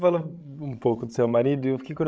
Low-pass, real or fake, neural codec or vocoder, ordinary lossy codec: none; fake; codec, 16 kHz, 16 kbps, FreqCodec, larger model; none